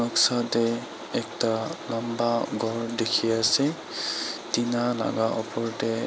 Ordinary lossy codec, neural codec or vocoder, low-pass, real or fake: none; none; none; real